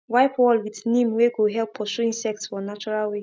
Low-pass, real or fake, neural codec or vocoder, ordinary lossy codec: none; real; none; none